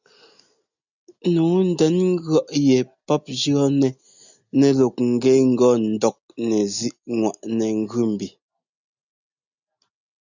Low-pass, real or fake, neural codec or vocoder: 7.2 kHz; real; none